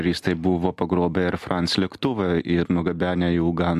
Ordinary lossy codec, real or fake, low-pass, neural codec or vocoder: AAC, 96 kbps; real; 14.4 kHz; none